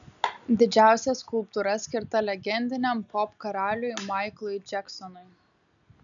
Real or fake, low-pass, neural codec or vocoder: real; 7.2 kHz; none